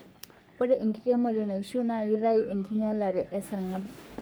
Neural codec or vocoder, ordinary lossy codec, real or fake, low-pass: codec, 44.1 kHz, 3.4 kbps, Pupu-Codec; none; fake; none